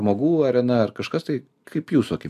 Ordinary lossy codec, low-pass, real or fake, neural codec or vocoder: MP3, 96 kbps; 14.4 kHz; real; none